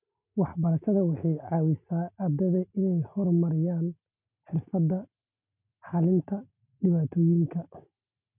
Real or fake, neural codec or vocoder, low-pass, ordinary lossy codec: real; none; 3.6 kHz; none